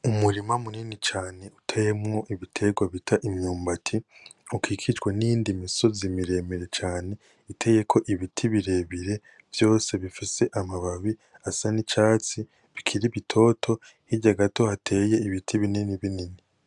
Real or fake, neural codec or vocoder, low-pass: real; none; 10.8 kHz